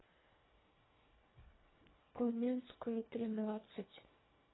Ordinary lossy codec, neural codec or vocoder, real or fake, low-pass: AAC, 16 kbps; codec, 24 kHz, 1.5 kbps, HILCodec; fake; 7.2 kHz